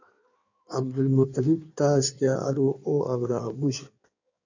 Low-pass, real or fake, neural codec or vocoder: 7.2 kHz; fake; codec, 16 kHz in and 24 kHz out, 1.1 kbps, FireRedTTS-2 codec